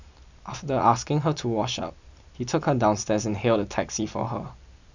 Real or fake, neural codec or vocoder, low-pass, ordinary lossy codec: real; none; 7.2 kHz; none